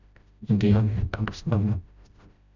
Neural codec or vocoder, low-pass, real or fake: codec, 16 kHz, 0.5 kbps, FreqCodec, smaller model; 7.2 kHz; fake